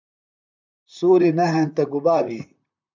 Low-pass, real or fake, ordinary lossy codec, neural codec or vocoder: 7.2 kHz; fake; MP3, 64 kbps; vocoder, 44.1 kHz, 128 mel bands, Pupu-Vocoder